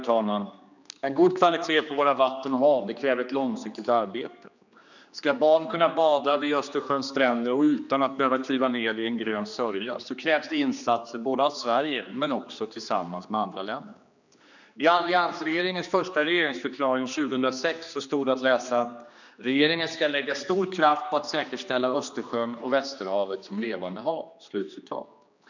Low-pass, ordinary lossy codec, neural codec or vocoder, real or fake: 7.2 kHz; none; codec, 16 kHz, 2 kbps, X-Codec, HuBERT features, trained on general audio; fake